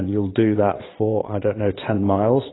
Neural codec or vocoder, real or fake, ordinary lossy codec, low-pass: vocoder, 22.05 kHz, 80 mel bands, Vocos; fake; AAC, 16 kbps; 7.2 kHz